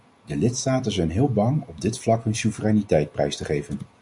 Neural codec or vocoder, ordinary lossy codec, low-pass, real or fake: none; MP3, 96 kbps; 10.8 kHz; real